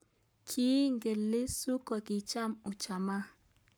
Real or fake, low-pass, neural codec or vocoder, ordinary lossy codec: fake; none; codec, 44.1 kHz, 7.8 kbps, Pupu-Codec; none